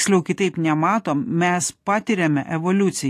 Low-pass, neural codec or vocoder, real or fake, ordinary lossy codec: 14.4 kHz; none; real; AAC, 48 kbps